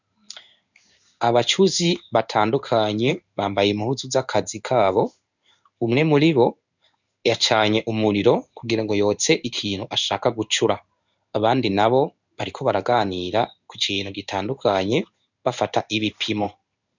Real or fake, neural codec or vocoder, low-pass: fake; codec, 16 kHz in and 24 kHz out, 1 kbps, XY-Tokenizer; 7.2 kHz